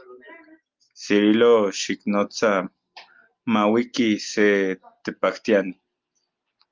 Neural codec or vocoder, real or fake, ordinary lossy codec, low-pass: none; real; Opus, 24 kbps; 7.2 kHz